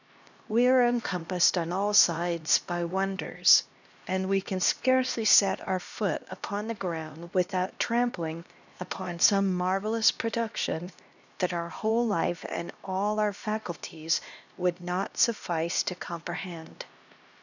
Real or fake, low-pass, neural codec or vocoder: fake; 7.2 kHz; codec, 16 kHz, 1 kbps, X-Codec, HuBERT features, trained on LibriSpeech